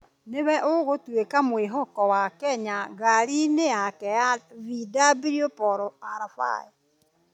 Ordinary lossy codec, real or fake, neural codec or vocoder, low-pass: none; real; none; 19.8 kHz